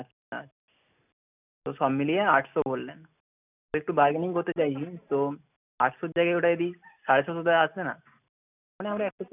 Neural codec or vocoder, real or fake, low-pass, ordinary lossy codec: none; real; 3.6 kHz; none